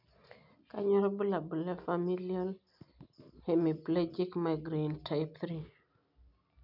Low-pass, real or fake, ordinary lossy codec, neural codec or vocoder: 5.4 kHz; real; none; none